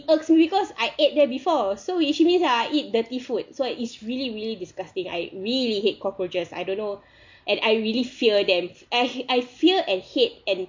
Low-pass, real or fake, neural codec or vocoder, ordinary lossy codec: 7.2 kHz; real; none; MP3, 48 kbps